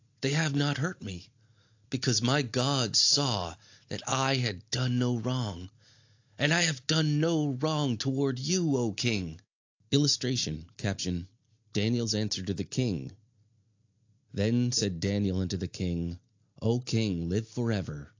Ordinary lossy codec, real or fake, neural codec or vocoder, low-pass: AAC, 48 kbps; real; none; 7.2 kHz